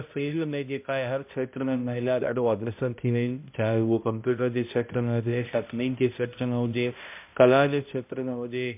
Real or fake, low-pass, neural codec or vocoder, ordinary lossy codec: fake; 3.6 kHz; codec, 16 kHz, 0.5 kbps, X-Codec, HuBERT features, trained on balanced general audio; MP3, 32 kbps